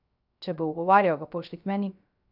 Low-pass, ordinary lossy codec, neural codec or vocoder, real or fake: 5.4 kHz; none; codec, 16 kHz, 0.3 kbps, FocalCodec; fake